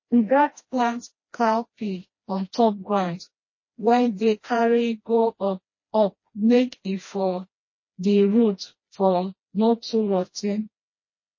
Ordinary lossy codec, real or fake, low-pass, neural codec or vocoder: MP3, 32 kbps; fake; 7.2 kHz; codec, 16 kHz, 1 kbps, FreqCodec, smaller model